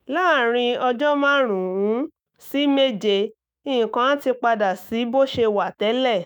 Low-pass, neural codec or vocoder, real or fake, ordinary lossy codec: none; autoencoder, 48 kHz, 128 numbers a frame, DAC-VAE, trained on Japanese speech; fake; none